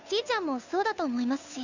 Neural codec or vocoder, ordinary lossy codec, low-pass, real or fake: codec, 16 kHz, 2 kbps, FunCodec, trained on Chinese and English, 25 frames a second; none; 7.2 kHz; fake